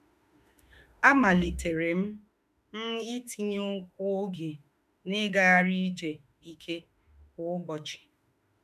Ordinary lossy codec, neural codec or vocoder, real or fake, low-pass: none; autoencoder, 48 kHz, 32 numbers a frame, DAC-VAE, trained on Japanese speech; fake; 14.4 kHz